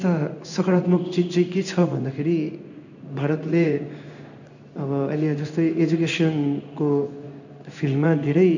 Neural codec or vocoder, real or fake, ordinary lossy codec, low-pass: codec, 16 kHz in and 24 kHz out, 1 kbps, XY-Tokenizer; fake; none; 7.2 kHz